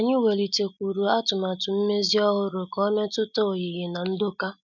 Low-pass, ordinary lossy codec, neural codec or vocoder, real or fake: 7.2 kHz; none; none; real